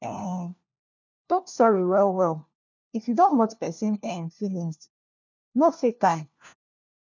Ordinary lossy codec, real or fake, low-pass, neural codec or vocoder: none; fake; 7.2 kHz; codec, 16 kHz, 1 kbps, FunCodec, trained on LibriTTS, 50 frames a second